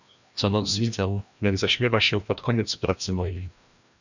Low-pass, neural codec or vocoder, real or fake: 7.2 kHz; codec, 16 kHz, 1 kbps, FreqCodec, larger model; fake